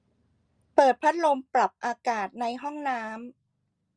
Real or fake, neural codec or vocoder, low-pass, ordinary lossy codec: real; none; 9.9 kHz; Opus, 32 kbps